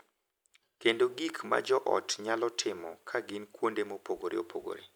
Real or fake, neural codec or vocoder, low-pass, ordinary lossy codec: real; none; none; none